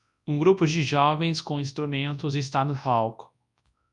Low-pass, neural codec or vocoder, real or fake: 10.8 kHz; codec, 24 kHz, 0.9 kbps, WavTokenizer, large speech release; fake